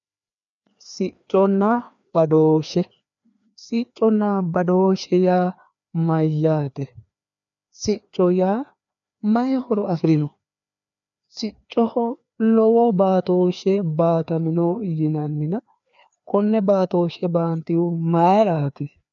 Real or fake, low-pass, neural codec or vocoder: fake; 7.2 kHz; codec, 16 kHz, 2 kbps, FreqCodec, larger model